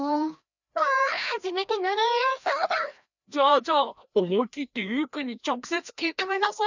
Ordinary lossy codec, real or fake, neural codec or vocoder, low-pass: none; fake; codec, 16 kHz, 1 kbps, FreqCodec, larger model; 7.2 kHz